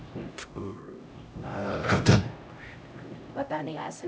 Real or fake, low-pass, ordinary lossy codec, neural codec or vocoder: fake; none; none; codec, 16 kHz, 0.5 kbps, X-Codec, HuBERT features, trained on LibriSpeech